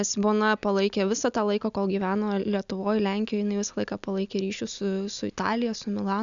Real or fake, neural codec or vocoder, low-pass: real; none; 7.2 kHz